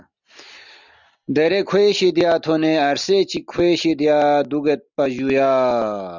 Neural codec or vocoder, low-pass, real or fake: none; 7.2 kHz; real